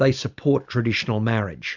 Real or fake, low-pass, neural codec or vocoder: real; 7.2 kHz; none